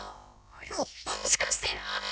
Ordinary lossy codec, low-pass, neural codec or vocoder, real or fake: none; none; codec, 16 kHz, about 1 kbps, DyCAST, with the encoder's durations; fake